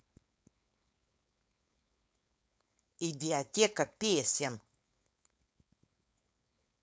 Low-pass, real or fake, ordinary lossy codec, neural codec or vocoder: none; fake; none; codec, 16 kHz, 4.8 kbps, FACodec